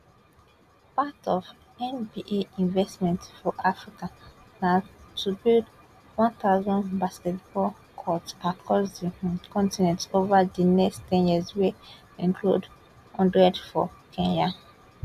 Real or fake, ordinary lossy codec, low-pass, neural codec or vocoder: real; none; 14.4 kHz; none